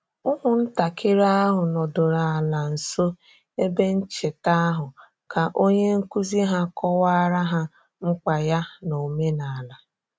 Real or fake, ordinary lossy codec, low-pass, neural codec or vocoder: real; none; none; none